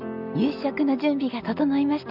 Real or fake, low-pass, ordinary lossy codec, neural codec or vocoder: real; 5.4 kHz; none; none